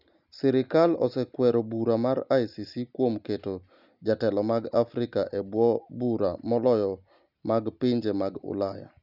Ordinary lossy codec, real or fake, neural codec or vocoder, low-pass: none; real; none; 5.4 kHz